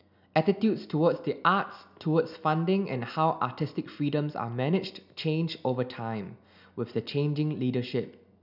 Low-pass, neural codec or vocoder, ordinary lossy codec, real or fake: 5.4 kHz; none; none; real